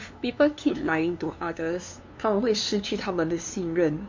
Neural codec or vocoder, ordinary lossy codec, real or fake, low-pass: codec, 16 kHz, 2 kbps, FunCodec, trained on LibriTTS, 25 frames a second; MP3, 48 kbps; fake; 7.2 kHz